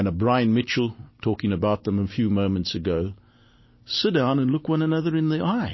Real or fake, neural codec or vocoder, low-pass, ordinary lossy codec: real; none; 7.2 kHz; MP3, 24 kbps